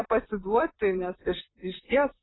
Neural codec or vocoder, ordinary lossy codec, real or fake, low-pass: vocoder, 44.1 kHz, 128 mel bands, Pupu-Vocoder; AAC, 16 kbps; fake; 7.2 kHz